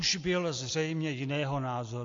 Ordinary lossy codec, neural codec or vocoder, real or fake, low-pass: AAC, 48 kbps; none; real; 7.2 kHz